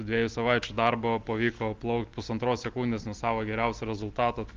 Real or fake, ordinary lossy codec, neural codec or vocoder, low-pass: real; Opus, 32 kbps; none; 7.2 kHz